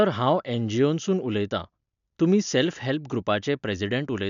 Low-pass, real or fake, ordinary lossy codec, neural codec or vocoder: 7.2 kHz; real; none; none